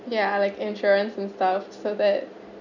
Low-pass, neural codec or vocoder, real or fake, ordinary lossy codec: 7.2 kHz; none; real; none